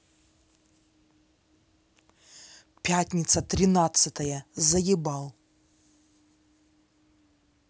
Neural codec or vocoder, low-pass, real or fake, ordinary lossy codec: none; none; real; none